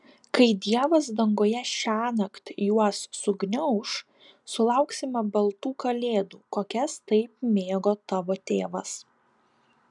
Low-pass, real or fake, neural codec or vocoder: 10.8 kHz; real; none